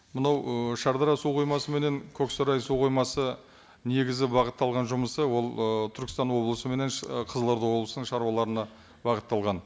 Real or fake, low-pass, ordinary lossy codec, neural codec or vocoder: real; none; none; none